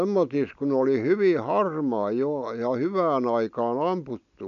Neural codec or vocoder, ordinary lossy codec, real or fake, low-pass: none; none; real; 7.2 kHz